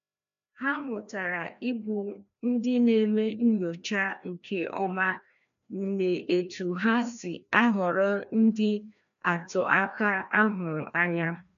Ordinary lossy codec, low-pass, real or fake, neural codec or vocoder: none; 7.2 kHz; fake; codec, 16 kHz, 1 kbps, FreqCodec, larger model